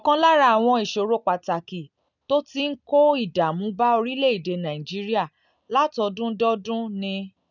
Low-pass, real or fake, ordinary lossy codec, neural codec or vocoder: 7.2 kHz; real; none; none